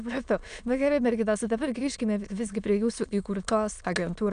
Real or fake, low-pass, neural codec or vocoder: fake; 9.9 kHz; autoencoder, 22.05 kHz, a latent of 192 numbers a frame, VITS, trained on many speakers